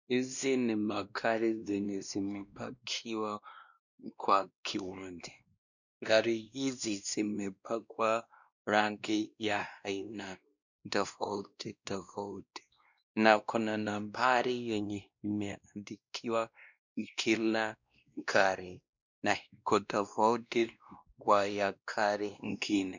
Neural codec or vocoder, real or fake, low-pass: codec, 16 kHz, 1 kbps, X-Codec, WavLM features, trained on Multilingual LibriSpeech; fake; 7.2 kHz